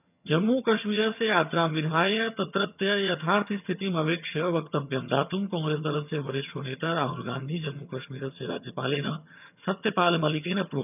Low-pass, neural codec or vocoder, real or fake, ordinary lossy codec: 3.6 kHz; vocoder, 22.05 kHz, 80 mel bands, HiFi-GAN; fake; none